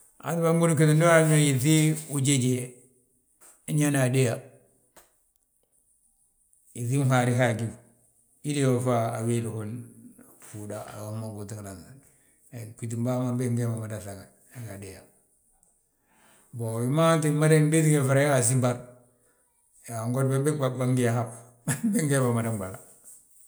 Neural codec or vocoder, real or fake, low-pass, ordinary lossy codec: none; real; none; none